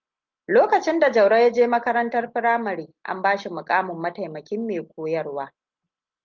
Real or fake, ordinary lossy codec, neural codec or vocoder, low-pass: real; Opus, 32 kbps; none; 7.2 kHz